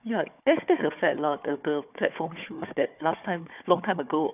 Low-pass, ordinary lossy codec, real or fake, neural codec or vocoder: 3.6 kHz; none; fake; codec, 16 kHz, 4 kbps, FunCodec, trained on Chinese and English, 50 frames a second